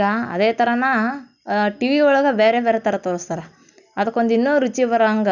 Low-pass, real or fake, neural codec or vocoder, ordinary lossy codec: 7.2 kHz; real; none; none